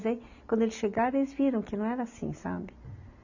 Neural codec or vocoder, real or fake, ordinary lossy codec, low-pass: none; real; none; 7.2 kHz